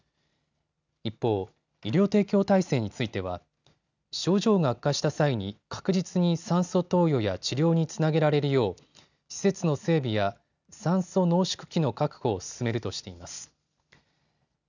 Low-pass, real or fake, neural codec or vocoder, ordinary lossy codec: 7.2 kHz; real; none; none